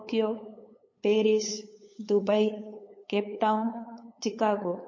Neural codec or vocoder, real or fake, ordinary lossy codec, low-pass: codec, 16 kHz, 8 kbps, FunCodec, trained on LibriTTS, 25 frames a second; fake; MP3, 32 kbps; 7.2 kHz